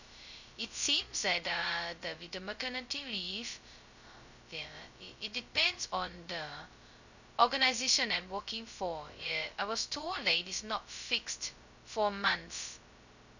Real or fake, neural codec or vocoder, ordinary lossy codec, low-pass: fake; codec, 16 kHz, 0.2 kbps, FocalCodec; none; 7.2 kHz